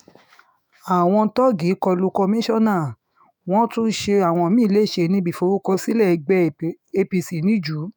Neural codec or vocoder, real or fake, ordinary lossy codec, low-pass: autoencoder, 48 kHz, 128 numbers a frame, DAC-VAE, trained on Japanese speech; fake; none; none